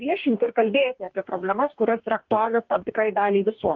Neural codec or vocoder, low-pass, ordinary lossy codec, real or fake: codec, 44.1 kHz, 2.6 kbps, DAC; 7.2 kHz; Opus, 32 kbps; fake